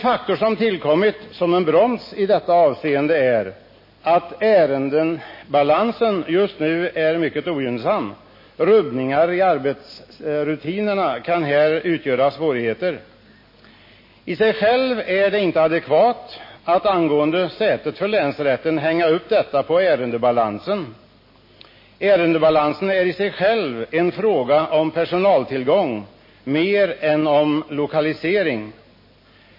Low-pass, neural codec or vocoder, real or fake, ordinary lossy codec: 5.4 kHz; none; real; MP3, 24 kbps